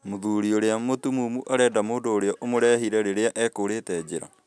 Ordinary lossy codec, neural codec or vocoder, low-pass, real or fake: none; none; none; real